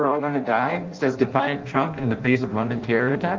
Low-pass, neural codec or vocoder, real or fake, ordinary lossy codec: 7.2 kHz; codec, 16 kHz in and 24 kHz out, 0.6 kbps, FireRedTTS-2 codec; fake; Opus, 24 kbps